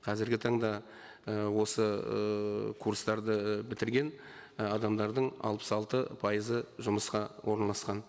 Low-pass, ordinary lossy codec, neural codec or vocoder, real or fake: none; none; none; real